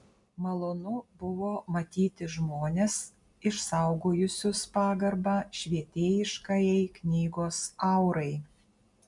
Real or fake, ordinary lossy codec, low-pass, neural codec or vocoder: real; MP3, 96 kbps; 10.8 kHz; none